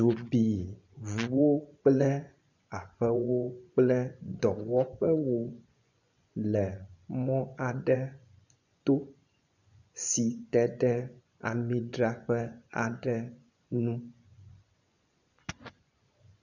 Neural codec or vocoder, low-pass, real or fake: vocoder, 24 kHz, 100 mel bands, Vocos; 7.2 kHz; fake